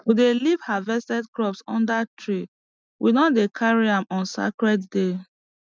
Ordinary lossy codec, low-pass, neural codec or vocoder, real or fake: none; none; none; real